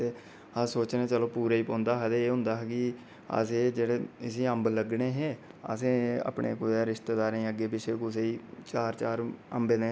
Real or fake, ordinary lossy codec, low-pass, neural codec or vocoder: real; none; none; none